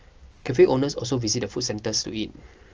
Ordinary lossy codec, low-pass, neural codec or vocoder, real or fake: Opus, 24 kbps; 7.2 kHz; none; real